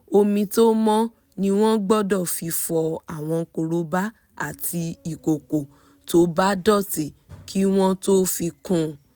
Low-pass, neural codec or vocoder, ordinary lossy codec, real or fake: none; none; none; real